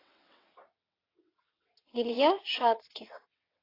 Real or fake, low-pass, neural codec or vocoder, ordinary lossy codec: fake; 5.4 kHz; vocoder, 22.05 kHz, 80 mel bands, WaveNeXt; AAC, 24 kbps